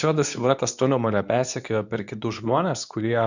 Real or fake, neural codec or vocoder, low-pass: fake; codec, 24 kHz, 0.9 kbps, WavTokenizer, medium speech release version 2; 7.2 kHz